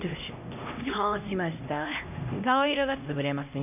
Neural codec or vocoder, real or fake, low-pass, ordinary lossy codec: codec, 16 kHz, 1 kbps, X-Codec, HuBERT features, trained on LibriSpeech; fake; 3.6 kHz; none